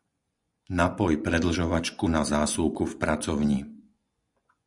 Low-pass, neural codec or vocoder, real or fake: 10.8 kHz; vocoder, 24 kHz, 100 mel bands, Vocos; fake